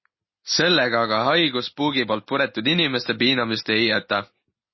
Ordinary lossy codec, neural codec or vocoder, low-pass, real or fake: MP3, 24 kbps; none; 7.2 kHz; real